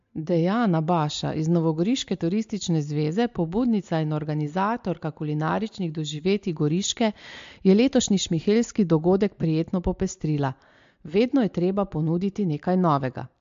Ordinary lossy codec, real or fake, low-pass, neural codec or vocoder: MP3, 48 kbps; real; 7.2 kHz; none